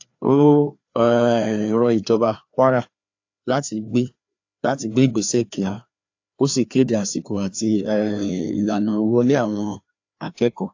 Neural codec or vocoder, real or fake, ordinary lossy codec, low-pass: codec, 16 kHz, 2 kbps, FreqCodec, larger model; fake; AAC, 48 kbps; 7.2 kHz